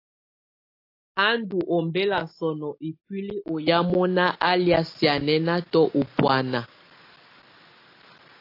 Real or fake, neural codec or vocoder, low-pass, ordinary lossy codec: real; none; 5.4 kHz; AAC, 32 kbps